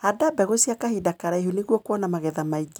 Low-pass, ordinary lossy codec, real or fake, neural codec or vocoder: none; none; real; none